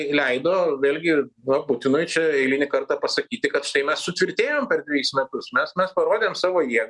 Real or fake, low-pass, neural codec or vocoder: real; 10.8 kHz; none